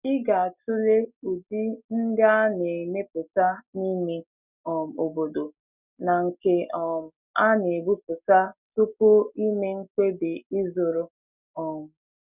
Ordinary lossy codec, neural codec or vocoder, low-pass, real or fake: none; none; 3.6 kHz; real